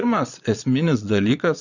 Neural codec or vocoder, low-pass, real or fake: vocoder, 22.05 kHz, 80 mel bands, Vocos; 7.2 kHz; fake